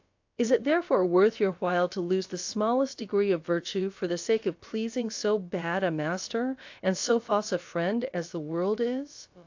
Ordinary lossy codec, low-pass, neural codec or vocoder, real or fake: AAC, 48 kbps; 7.2 kHz; codec, 16 kHz, about 1 kbps, DyCAST, with the encoder's durations; fake